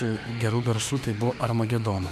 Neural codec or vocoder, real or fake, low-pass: autoencoder, 48 kHz, 32 numbers a frame, DAC-VAE, trained on Japanese speech; fake; 14.4 kHz